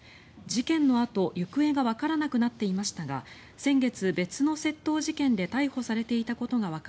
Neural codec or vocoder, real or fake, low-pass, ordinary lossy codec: none; real; none; none